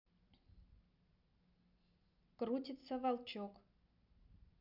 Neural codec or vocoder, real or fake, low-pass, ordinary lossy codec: none; real; 5.4 kHz; none